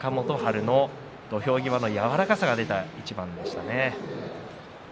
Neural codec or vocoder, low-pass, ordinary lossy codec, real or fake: none; none; none; real